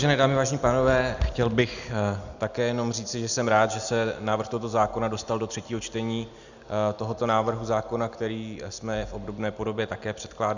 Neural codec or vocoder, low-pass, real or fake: none; 7.2 kHz; real